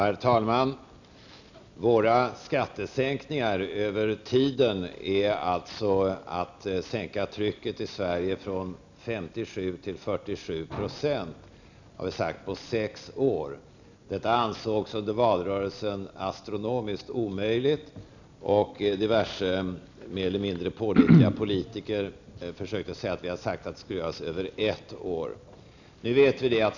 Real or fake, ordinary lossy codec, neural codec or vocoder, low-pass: real; none; none; 7.2 kHz